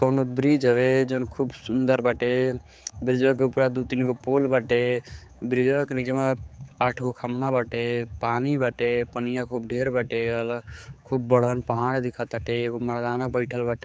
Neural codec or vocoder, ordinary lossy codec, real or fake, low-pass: codec, 16 kHz, 4 kbps, X-Codec, HuBERT features, trained on general audio; none; fake; none